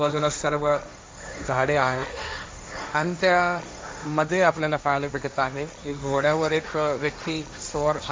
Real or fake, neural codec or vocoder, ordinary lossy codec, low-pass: fake; codec, 16 kHz, 1.1 kbps, Voila-Tokenizer; none; none